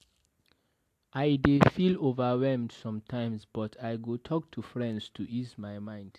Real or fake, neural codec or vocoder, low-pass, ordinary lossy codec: real; none; 14.4 kHz; AAC, 64 kbps